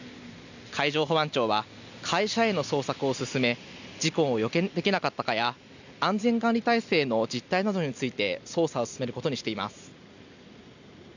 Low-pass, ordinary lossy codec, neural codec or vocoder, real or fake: 7.2 kHz; none; none; real